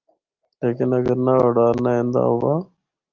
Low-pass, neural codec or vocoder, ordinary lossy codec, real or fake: 7.2 kHz; none; Opus, 32 kbps; real